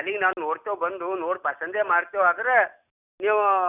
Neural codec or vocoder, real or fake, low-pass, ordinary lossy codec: none; real; 3.6 kHz; none